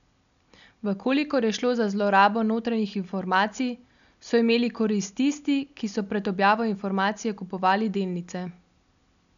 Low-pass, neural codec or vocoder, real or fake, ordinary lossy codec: 7.2 kHz; none; real; none